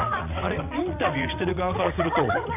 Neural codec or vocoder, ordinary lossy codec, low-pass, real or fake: vocoder, 22.05 kHz, 80 mel bands, WaveNeXt; none; 3.6 kHz; fake